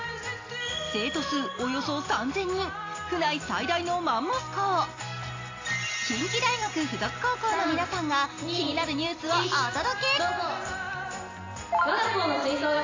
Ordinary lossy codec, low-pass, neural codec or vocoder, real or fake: AAC, 32 kbps; 7.2 kHz; none; real